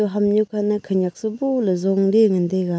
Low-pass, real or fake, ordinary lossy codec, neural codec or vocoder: none; real; none; none